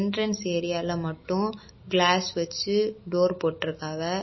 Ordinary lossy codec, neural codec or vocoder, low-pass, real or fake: MP3, 24 kbps; none; 7.2 kHz; real